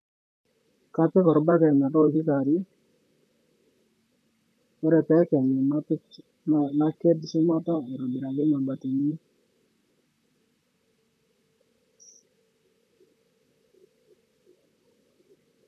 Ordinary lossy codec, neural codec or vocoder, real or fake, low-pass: AAC, 64 kbps; vocoder, 44.1 kHz, 128 mel bands, Pupu-Vocoder; fake; 14.4 kHz